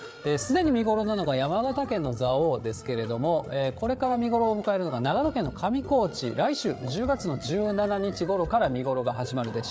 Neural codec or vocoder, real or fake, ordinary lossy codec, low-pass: codec, 16 kHz, 8 kbps, FreqCodec, larger model; fake; none; none